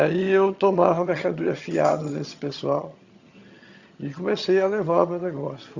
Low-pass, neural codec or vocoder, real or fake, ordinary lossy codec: 7.2 kHz; vocoder, 22.05 kHz, 80 mel bands, HiFi-GAN; fake; Opus, 64 kbps